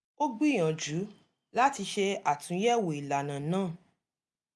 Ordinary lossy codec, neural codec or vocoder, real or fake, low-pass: none; none; real; none